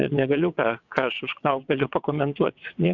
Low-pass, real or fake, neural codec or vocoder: 7.2 kHz; fake; vocoder, 22.05 kHz, 80 mel bands, WaveNeXt